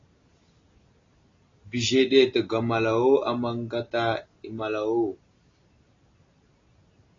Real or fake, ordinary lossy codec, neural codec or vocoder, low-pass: real; AAC, 32 kbps; none; 7.2 kHz